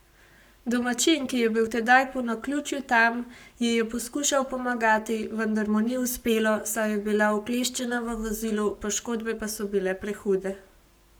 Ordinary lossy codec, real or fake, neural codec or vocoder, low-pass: none; fake; codec, 44.1 kHz, 7.8 kbps, Pupu-Codec; none